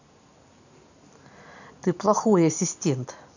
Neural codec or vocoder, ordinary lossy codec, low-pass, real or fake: none; none; 7.2 kHz; real